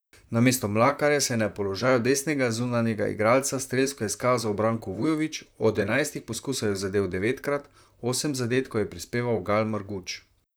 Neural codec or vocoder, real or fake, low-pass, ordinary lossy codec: vocoder, 44.1 kHz, 128 mel bands, Pupu-Vocoder; fake; none; none